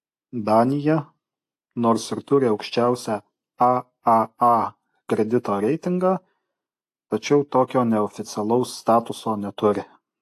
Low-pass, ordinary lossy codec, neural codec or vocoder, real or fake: 14.4 kHz; AAC, 64 kbps; none; real